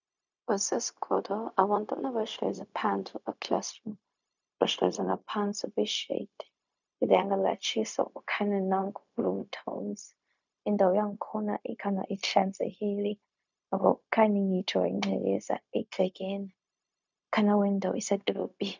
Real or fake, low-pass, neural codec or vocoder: fake; 7.2 kHz; codec, 16 kHz, 0.4 kbps, LongCat-Audio-Codec